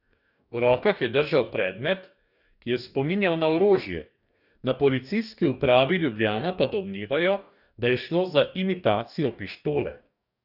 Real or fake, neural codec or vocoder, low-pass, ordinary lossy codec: fake; codec, 44.1 kHz, 2.6 kbps, DAC; 5.4 kHz; none